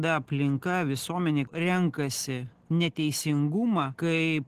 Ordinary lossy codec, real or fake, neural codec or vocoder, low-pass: Opus, 16 kbps; real; none; 14.4 kHz